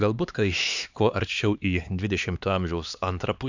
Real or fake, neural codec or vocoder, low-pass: fake; codec, 16 kHz, 2 kbps, X-Codec, HuBERT features, trained on LibriSpeech; 7.2 kHz